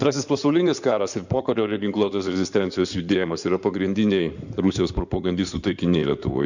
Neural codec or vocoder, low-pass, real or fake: codec, 16 kHz in and 24 kHz out, 2.2 kbps, FireRedTTS-2 codec; 7.2 kHz; fake